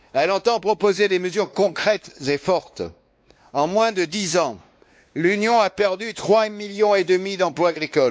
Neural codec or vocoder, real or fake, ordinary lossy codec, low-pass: codec, 16 kHz, 2 kbps, X-Codec, WavLM features, trained on Multilingual LibriSpeech; fake; none; none